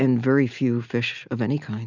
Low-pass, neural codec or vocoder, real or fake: 7.2 kHz; none; real